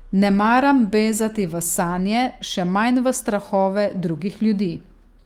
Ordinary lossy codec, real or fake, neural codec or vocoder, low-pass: Opus, 24 kbps; fake; autoencoder, 48 kHz, 128 numbers a frame, DAC-VAE, trained on Japanese speech; 19.8 kHz